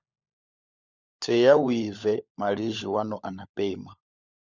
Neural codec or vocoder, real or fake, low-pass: codec, 16 kHz, 16 kbps, FunCodec, trained on LibriTTS, 50 frames a second; fake; 7.2 kHz